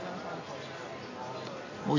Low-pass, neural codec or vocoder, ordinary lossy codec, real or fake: 7.2 kHz; none; AAC, 48 kbps; real